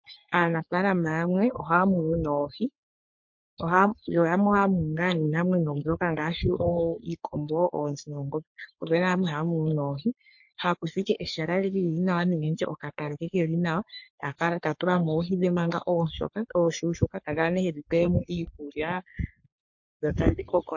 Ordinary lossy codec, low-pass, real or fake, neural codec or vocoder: MP3, 48 kbps; 7.2 kHz; fake; codec, 44.1 kHz, 3.4 kbps, Pupu-Codec